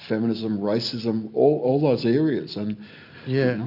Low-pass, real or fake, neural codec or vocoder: 5.4 kHz; real; none